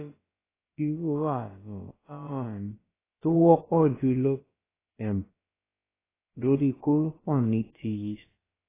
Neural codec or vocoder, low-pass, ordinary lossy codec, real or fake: codec, 16 kHz, about 1 kbps, DyCAST, with the encoder's durations; 3.6 kHz; AAC, 16 kbps; fake